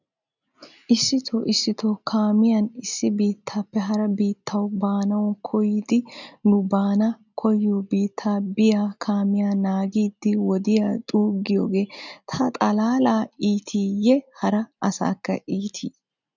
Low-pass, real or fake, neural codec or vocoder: 7.2 kHz; real; none